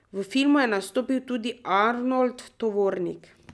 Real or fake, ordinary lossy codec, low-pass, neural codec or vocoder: real; none; none; none